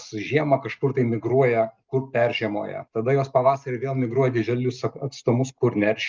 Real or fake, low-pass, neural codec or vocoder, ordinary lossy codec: real; 7.2 kHz; none; Opus, 24 kbps